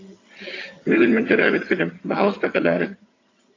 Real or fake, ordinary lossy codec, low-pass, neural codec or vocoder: fake; AAC, 32 kbps; 7.2 kHz; vocoder, 22.05 kHz, 80 mel bands, HiFi-GAN